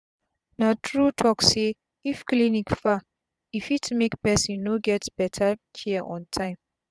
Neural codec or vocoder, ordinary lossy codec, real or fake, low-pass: vocoder, 22.05 kHz, 80 mel bands, Vocos; none; fake; none